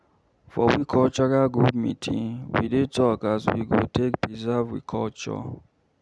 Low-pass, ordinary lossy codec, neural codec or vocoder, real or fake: none; none; none; real